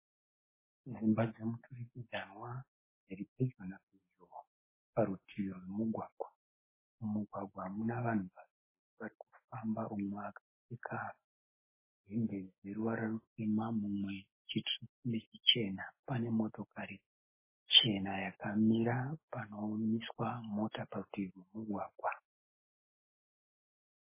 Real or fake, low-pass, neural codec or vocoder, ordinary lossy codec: real; 3.6 kHz; none; MP3, 16 kbps